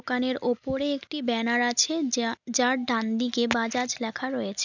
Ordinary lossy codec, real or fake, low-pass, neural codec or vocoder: none; real; 7.2 kHz; none